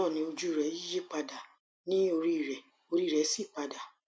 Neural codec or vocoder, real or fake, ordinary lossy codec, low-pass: none; real; none; none